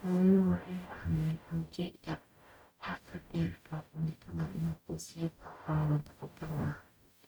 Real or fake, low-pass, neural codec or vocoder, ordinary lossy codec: fake; none; codec, 44.1 kHz, 0.9 kbps, DAC; none